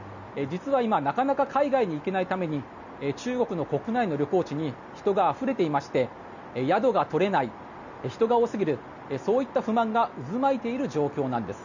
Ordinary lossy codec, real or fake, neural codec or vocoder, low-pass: none; real; none; 7.2 kHz